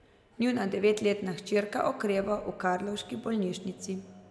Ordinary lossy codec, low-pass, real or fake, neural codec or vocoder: none; none; real; none